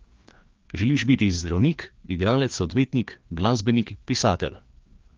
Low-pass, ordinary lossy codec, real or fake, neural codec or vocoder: 7.2 kHz; Opus, 32 kbps; fake; codec, 16 kHz, 2 kbps, FreqCodec, larger model